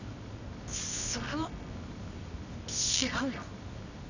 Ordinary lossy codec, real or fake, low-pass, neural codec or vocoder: none; fake; 7.2 kHz; codec, 24 kHz, 0.9 kbps, WavTokenizer, medium music audio release